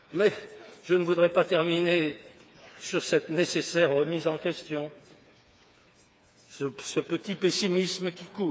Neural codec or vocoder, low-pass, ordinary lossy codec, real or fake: codec, 16 kHz, 4 kbps, FreqCodec, smaller model; none; none; fake